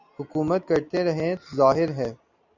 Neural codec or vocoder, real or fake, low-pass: none; real; 7.2 kHz